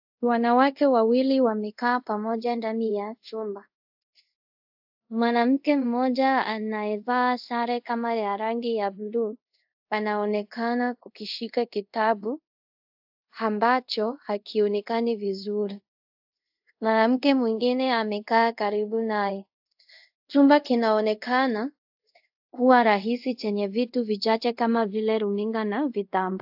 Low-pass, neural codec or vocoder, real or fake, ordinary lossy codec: 5.4 kHz; codec, 24 kHz, 0.5 kbps, DualCodec; fake; AAC, 48 kbps